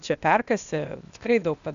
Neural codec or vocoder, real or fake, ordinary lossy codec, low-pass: codec, 16 kHz, 0.8 kbps, ZipCodec; fake; MP3, 96 kbps; 7.2 kHz